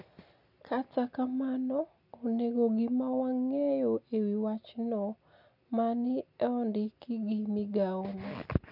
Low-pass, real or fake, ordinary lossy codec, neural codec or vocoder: 5.4 kHz; real; none; none